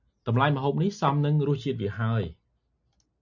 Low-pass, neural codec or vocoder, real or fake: 7.2 kHz; none; real